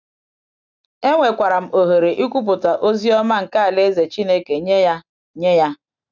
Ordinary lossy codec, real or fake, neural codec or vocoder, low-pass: none; real; none; 7.2 kHz